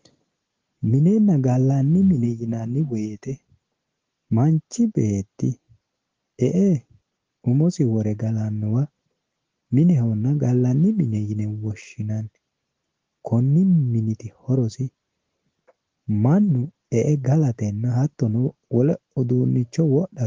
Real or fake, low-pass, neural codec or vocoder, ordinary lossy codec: real; 7.2 kHz; none; Opus, 16 kbps